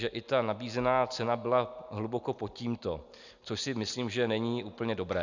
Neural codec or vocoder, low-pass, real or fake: none; 7.2 kHz; real